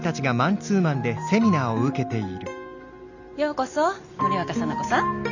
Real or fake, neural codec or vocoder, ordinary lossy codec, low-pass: real; none; none; 7.2 kHz